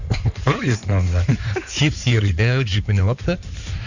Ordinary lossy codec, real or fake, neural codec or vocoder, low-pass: none; fake; codec, 16 kHz in and 24 kHz out, 2.2 kbps, FireRedTTS-2 codec; 7.2 kHz